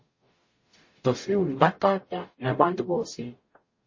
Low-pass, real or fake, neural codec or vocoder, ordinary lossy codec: 7.2 kHz; fake; codec, 44.1 kHz, 0.9 kbps, DAC; MP3, 32 kbps